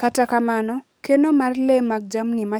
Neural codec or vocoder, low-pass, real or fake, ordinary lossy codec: codec, 44.1 kHz, 7.8 kbps, DAC; none; fake; none